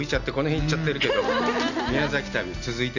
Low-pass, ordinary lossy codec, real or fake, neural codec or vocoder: 7.2 kHz; none; real; none